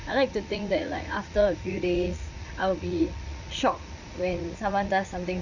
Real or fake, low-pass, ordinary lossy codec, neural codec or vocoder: fake; 7.2 kHz; Opus, 64 kbps; vocoder, 44.1 kHz, 80 mel bands, Vocos